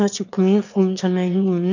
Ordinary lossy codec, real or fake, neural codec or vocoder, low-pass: none; fake; autoencoder, 22.05 kHz, a latent of 192 numbers a frame, VITS, trained on one speaker; 7.2 kHz